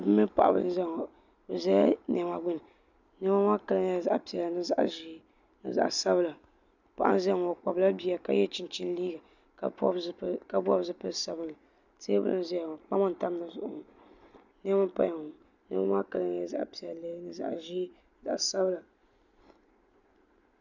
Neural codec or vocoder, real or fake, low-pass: none; real; 7.2 kHz